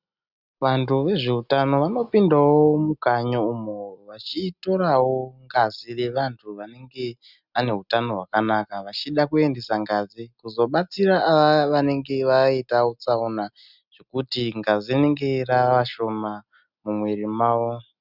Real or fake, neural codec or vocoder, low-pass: real; none; 5.4 kHz